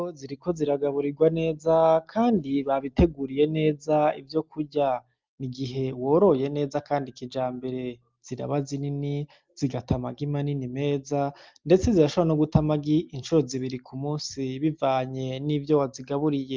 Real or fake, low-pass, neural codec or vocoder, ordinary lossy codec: real; 7.2 kHz; none; Opus, 32 kbps